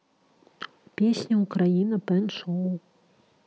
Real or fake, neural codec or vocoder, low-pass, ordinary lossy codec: real; none; none; none